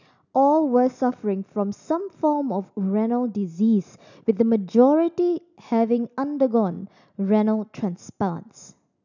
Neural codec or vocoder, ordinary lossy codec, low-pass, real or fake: none; none; 7.2 kHz; real